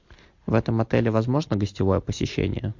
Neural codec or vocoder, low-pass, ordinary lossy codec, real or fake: none; 7.2 kHz; MP3, 48 kbps; real